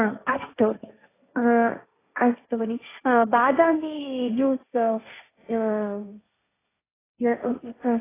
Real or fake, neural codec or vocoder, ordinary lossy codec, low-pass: fake; codec, 16 kHz, 1.1 kbps, Voila-Tokenizer; AAC, 16 kbps; 3.6 kHz